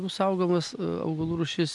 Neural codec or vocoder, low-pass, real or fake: none; 10.8 kHz; real